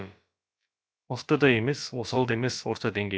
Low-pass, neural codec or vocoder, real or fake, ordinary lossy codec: none; codec, 16 kHz, about 1 kbps, DyCAST, with the encoder's durations; fake; none